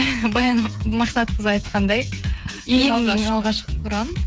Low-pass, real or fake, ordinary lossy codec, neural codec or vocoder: none; fake; none; codec, 16 kHz, 8 kbps, FreqCodec, smaller model